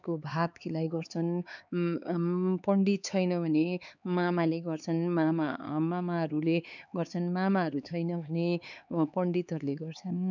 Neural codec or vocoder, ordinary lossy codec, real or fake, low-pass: codec, 16 kHz, 4 kbps, X-Codec, HuBERT features, trained on LibriSpeech; none; fake; 7.2 kHz